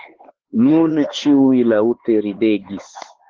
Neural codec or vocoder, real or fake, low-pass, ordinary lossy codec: codec, 16 kHz, 4 kbps, X-Codec, HuBERT features, trained on LibriSpeech; fake; 7.2 kHz; Opus, 16 kbps